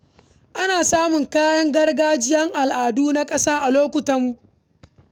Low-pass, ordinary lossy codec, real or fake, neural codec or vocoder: 19.8 kHz; none; fake; codec, 44.1 kHz, 7.8 kbps, DAC